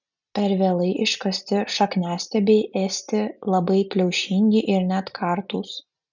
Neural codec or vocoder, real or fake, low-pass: none; real; 7.2 kHz